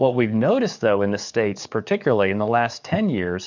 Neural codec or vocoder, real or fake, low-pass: codec, 44.1 kHz, 7.8 kbps, DAC; fake; 7.2 kHz